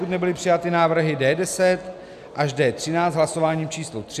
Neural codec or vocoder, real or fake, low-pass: none; real; 14.4 kHz